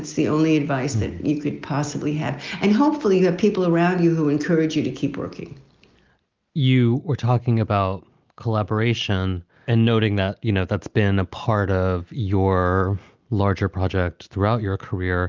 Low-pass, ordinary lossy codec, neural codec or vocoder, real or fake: 7.2 kHz; Opus, 32 kbps; none; real